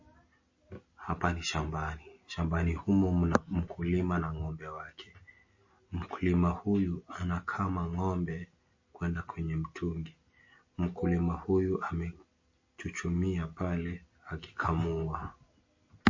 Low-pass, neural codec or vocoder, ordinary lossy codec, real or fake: 7.2 kHz; none; MP3, 32 kbps; real